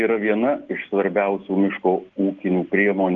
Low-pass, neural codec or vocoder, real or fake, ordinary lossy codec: 7.2 kHz; none; real; Opus, 16 kbps